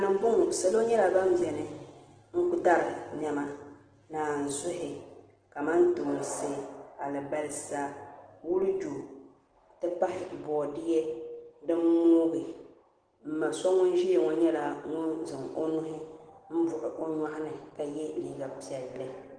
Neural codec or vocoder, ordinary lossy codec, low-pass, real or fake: none; Opus, 16 kbps; 9.9 kHz; real